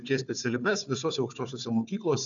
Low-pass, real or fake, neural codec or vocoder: 7.2 kHz; fake; codec, 16 kHz, 4 kbps, FunCodec, trained on Chinese and English, 50 frames a second